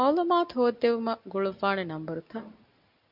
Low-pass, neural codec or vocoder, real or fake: 5.4 kHz; none; real